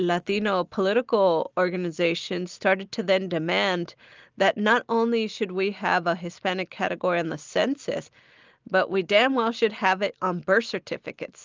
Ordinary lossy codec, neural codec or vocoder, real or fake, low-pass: Opus, 24 kbps; none; real; 7.2 kHz